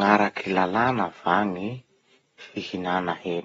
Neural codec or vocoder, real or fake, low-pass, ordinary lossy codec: none; real; 19.8 kHz; AAC, 24 kbps